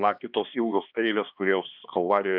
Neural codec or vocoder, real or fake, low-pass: codec, 16 kHz, 2 kbps, X-Codec, HuBERT features, trained on balanced general audio; fake; 5.4 kHz